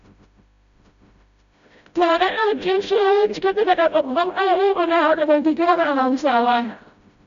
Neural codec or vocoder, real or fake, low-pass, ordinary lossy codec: codec, 16 kHz, 0.5 kbps, FreqCodec, smaller model; fake; 7.2 kHz; none